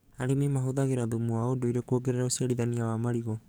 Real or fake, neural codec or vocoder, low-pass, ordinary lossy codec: fake; codec, 44.1 kHz, 7.8 kbps, DAC; none; none